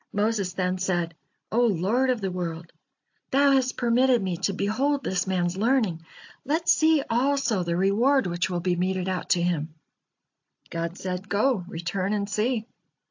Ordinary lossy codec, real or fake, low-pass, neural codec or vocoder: AAC, 48 kbps; real; 7.2 kHz; none